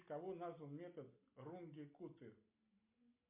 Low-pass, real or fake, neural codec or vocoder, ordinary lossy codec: 3.6 kHz; real; none; MP3, 32 kbps